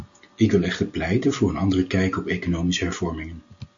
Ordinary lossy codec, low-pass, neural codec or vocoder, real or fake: MP3, 64 kbps; 7.2 kHz; none; real